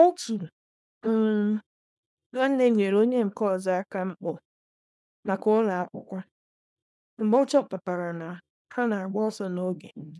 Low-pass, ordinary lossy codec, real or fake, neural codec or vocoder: none; none; fake; codec, 24 kHz, 0.9 kbps, WavTokenizer, small release